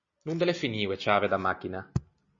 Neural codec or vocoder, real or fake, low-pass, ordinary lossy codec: none; real; 7.2 kHz; MP3, 32 kbps